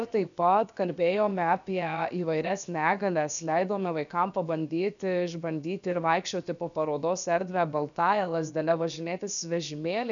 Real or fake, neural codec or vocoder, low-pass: fake; codec, 16 kHz, about 1 kbps, DyCAST, with the encoder's durations; 7.2 kHz